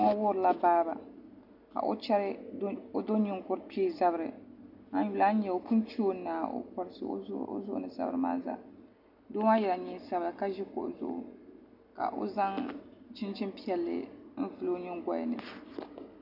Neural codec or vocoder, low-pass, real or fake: none; 5.4 kHz; real